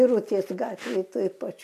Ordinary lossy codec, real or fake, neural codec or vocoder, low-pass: AAC, 96 kbps; real; none; 14.4 kHz